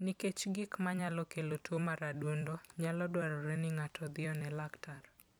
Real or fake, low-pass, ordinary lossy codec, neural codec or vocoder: fake; none; none; vocoder, 44.1 kHz, 128 mel bands every 256 samples, BigVGAN v2